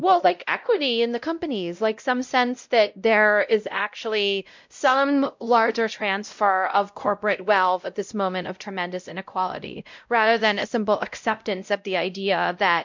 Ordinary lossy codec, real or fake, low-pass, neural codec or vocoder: MP3, 64 kbps; fake; 7.2 kHz; codec, 16 kHz, 0.5 kbps, X-Codec, WavLM features, trained on Multilingual LibriSpeech